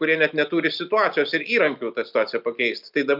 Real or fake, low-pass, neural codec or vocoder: real; 5.4 kHz; none